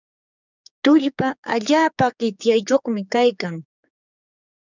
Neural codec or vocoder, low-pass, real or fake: codec, 16 kHz, 4 kbps, X-Codec, HuBERT features, trained on general audio; 7.2 kHz; fake